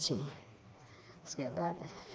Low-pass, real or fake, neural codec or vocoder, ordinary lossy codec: none; fake; codec, 16 kHz, 2 kbps, FreqCodec, smaller model; none